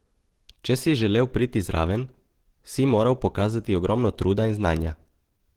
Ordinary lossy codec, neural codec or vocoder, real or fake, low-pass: Opus, 16 kbps; codec, 44.1 kHz, 7.8 kbps, DAC; fake; 19.8 kHz